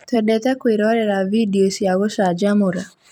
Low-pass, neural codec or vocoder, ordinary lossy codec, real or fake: 19.8 kHz; vocoder, 44.1 kHz, 128 mel bands every 256 samples, BigVGAN v2; none; fake